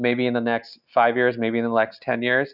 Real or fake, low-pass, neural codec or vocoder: real; 5.4 kHz; none